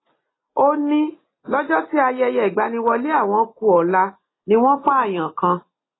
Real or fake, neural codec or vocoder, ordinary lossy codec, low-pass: real; none; AAC, 16 kbps; 7.2 kHz